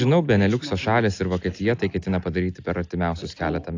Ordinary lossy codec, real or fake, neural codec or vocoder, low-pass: AAC, 48 kbps; real; none; 7.2 kHz